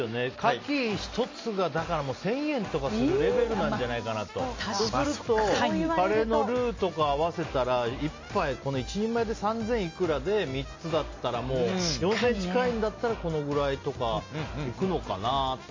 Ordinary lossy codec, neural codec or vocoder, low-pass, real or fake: MP3, 32 kbps; none; 7.2 kHz; real